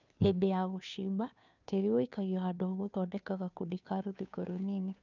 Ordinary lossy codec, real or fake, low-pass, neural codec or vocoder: none; fake; 7.2 kHz; codec, 16 kHz, 2 kbps, FunCodec, trained on Chinese and English, 25 frames a second